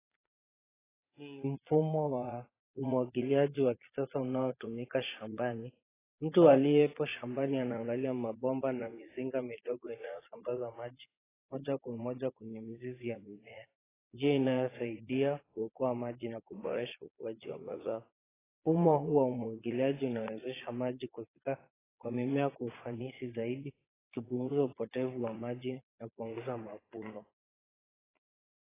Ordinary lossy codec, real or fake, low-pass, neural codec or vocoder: AAC, 16 kbps; fake; 3.6 kHz; vocoder, 22.05 kHz, 80 mel bands, Vocos